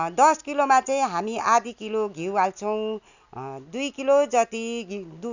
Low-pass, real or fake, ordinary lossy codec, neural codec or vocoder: 7.2 kHz; real; none; none